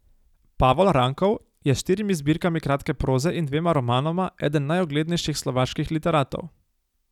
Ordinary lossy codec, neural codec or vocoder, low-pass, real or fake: none; none; 19.8 kHz; real